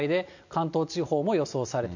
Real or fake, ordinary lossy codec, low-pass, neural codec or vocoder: real; none; 7.2 kHz; none